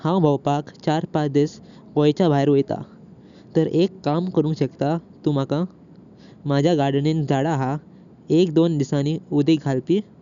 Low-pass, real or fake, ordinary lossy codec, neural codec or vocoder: 7.2 kHz; real; none; none